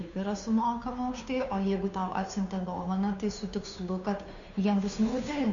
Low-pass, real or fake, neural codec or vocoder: 7.2 kHz; fake; codec, 16 kHz, 2 kbps, FunCodec, trained on Chinese and English, 25 frames a second